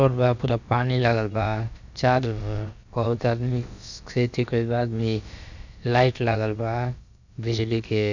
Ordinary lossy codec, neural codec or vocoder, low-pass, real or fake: none; codec, 16 kHz, about 1 kbps, DyCAST, with the encoder's durations; 7.2 kHz; fake